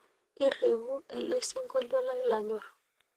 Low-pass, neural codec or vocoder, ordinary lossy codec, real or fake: 14.4 kHz; codec, 32 kHz, 1.9 kbps, SNAC; Opus, 16 kbps; fake